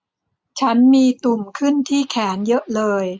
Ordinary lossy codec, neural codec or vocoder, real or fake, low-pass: none; none; real; none